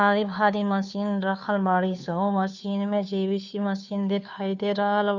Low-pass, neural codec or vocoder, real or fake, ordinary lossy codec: 7.2 kHz; codec, 16 kHz, 2 kbps, FunCodec, trained on Chinese and English, 25 frames a second; fake; none